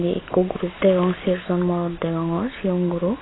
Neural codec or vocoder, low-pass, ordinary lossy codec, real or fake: none; 7.2 kHz; AAC, 16 kbps; real